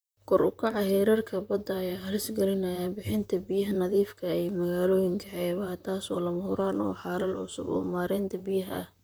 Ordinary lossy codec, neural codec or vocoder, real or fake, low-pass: none; vocoder, 44.1 kHz, 128 mel bands, Pupu-Vocoder; fake; none